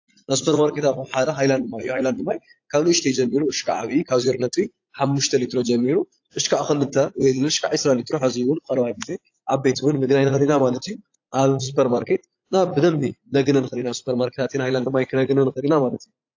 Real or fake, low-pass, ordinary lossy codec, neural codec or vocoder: fake; 7.2 kHz; AAC, 48 kbps; vocoder, 44.1 kHz, 80 mel bands, Vocos